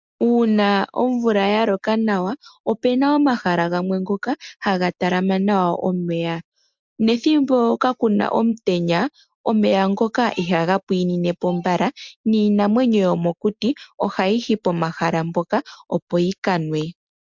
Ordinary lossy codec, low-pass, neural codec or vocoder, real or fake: MP3, 64 kbps; 7.2 kHz; none; real